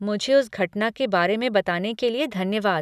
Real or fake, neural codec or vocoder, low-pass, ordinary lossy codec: real; none; 14.4 kHz; none